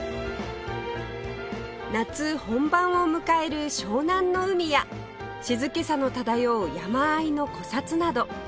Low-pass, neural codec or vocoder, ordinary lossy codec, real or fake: none; none; none; real